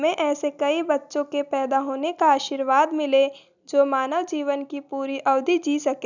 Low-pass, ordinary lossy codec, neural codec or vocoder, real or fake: 7.2 kHz; none; none; real